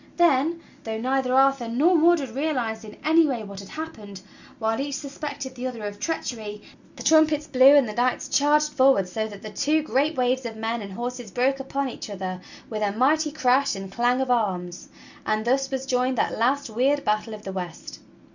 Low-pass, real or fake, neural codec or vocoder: 7.2 kHz; real; none